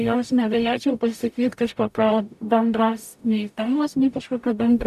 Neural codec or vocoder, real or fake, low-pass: codec, 44.1 kHz, 0.9 kbps, DAC; fake; 14.4 kHz